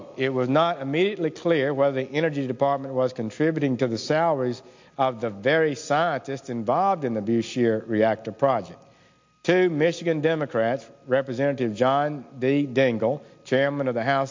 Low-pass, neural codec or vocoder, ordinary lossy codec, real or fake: 7.2 kHz; none; MP3, 48 kbps; real